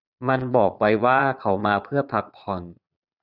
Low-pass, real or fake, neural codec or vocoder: 5.4 kHz; fake; vocoder, 22.05 kHz, 80 mel bands, Vocos